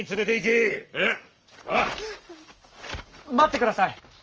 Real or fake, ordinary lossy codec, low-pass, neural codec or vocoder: fake; Opus, 24 kbps; 7.2 kHz; codec, 44.1 kHz, 7.8 kbps, Pupu-Codec